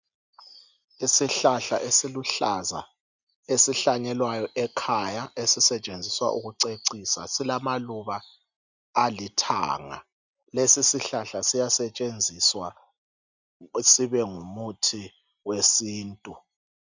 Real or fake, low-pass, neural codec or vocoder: real; 7.2 kHz; none